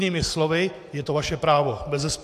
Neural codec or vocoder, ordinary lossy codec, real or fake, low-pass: vocoder, 44.1 kHz, 128 mel bands every 512 samples, BigVGAN v2; MP3, 96 kbps; fake; 14.4 kHz